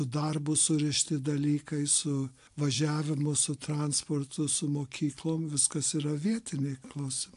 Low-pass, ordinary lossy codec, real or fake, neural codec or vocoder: 10.8 kHz; AAC, 64 kbps; real; none